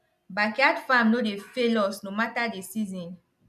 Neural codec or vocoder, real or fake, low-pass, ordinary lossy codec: none; real; 14.4 kHz; none